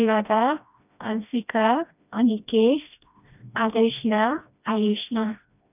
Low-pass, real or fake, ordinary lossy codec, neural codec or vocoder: 3.6 kHz; fake; none; codec, 16 kHz, 1 kbps, FreqCodec, smaller model